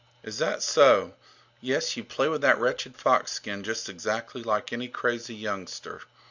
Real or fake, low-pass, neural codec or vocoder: real; 7.2 kHz; none